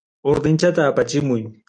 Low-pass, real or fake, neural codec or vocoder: 9.9 kHz; real; none